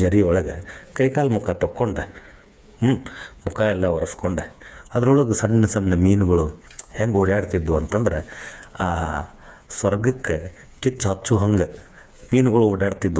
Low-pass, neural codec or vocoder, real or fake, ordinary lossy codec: none; codec, 16 kHz, 4 kbps, FreqCodec, smaller model; fake; none